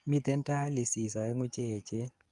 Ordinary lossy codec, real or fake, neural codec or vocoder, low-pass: Opus, 32 kbps; fake; codec, 44.1 kHz, 7.8 kbps, DAC; 10.8 kHz